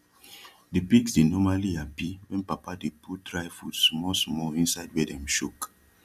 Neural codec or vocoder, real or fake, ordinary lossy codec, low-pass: none; real; none; 14.4 kHz